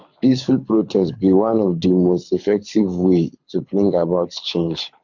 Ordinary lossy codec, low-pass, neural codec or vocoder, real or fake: MP3, 48 kbps; 7.2 kHz; codec, 24 kHz, 6 kbps, HILCodec; fake